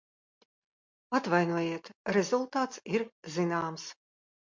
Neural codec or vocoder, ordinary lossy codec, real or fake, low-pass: none; MP3, 64 kbps; real; 7.2 kHz